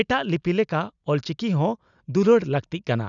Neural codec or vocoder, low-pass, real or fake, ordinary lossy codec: none; 7.2 kHz; real; none